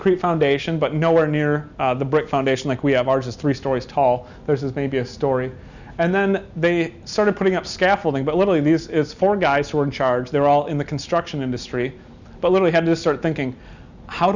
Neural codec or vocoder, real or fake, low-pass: none; real; 7.2 kHz